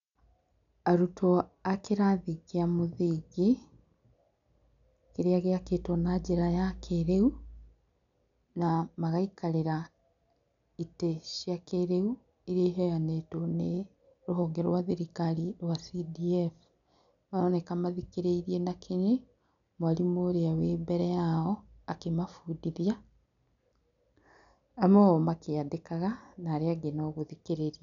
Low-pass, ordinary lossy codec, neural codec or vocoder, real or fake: 7.2 kHz; none; none; real